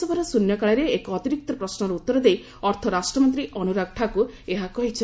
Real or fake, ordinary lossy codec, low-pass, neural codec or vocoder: real; none; none; none